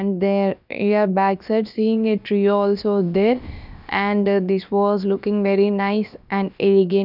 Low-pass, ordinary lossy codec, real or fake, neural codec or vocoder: 5.4 kHz; none; fake; codec, 16 kHz, about 1 kbps, DyCAST, with the encoder's durations